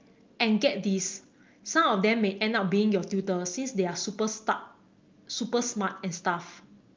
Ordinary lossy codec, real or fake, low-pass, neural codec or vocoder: Opus, 24 kbps; real; 7.2 kHz; none